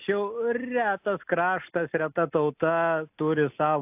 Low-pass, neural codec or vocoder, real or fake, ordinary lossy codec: 3.6 kHz; none; real; AAC, 32 kbps